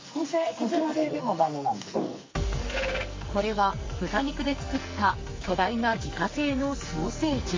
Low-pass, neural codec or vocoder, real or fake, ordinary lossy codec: 7.2 kHz; codec, 44.1 kHz, 2.6 kbps, SNAC; fake; MP3, 32 kbps